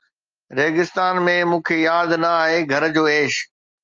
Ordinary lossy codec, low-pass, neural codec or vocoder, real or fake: Opus, 32 kbps; 7.2 kHz; none; real